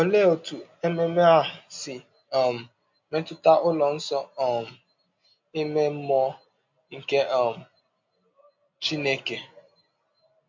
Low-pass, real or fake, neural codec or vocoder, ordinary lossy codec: 7.2 kHz; real; none; MP3, 48 kbps